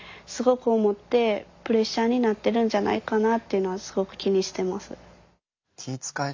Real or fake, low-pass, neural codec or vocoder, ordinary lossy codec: real; 7.2 kHz; none; MP3, 64 kbps